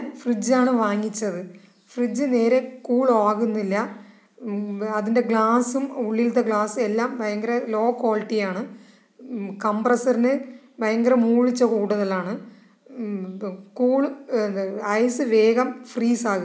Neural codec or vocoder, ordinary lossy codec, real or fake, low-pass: none; none; real; none